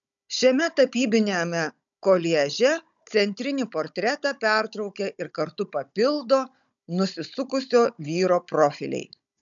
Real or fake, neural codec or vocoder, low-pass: fake; codec, 16 kHz, 16 kbps, FunCodec, trained on Chinese and English, 50 frames a second; 7.2 kHz